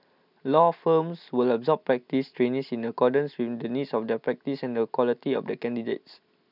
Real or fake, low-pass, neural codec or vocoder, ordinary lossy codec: real; 5.4 kHz; none; none